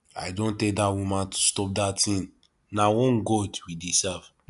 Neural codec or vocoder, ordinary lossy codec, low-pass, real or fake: none; none; 10.8 kHz; real